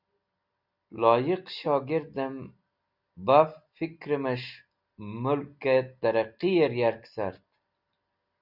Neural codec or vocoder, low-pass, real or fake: none; 5.4 kHz; real